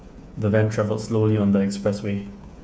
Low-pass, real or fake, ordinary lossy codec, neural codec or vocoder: none; fake; none; codec, 16 kHz, 8 kbps, FreqCodec, smaller model